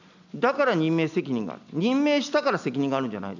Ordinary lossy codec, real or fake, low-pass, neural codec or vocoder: none; real; 7.2 kHz; none